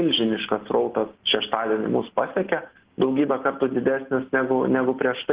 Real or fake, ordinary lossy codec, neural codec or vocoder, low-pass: real; Opus, 16 kbps; none; 3.6 kHz